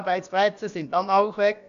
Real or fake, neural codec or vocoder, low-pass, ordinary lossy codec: fake; codec, 16 kHz, about 1 kbps, DyCAST, with the encoder's durations; 7.2 kHz; none